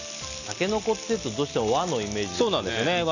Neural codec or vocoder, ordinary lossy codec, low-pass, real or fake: none; none; 7.2 kHz; real